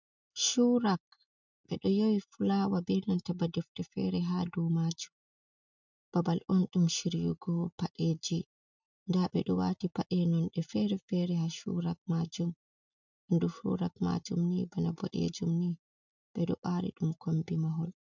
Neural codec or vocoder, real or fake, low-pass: none; real; 7.2 kHz